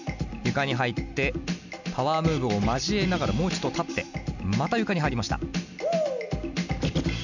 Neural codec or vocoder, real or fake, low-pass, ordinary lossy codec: none; real; 7.2 kHz; none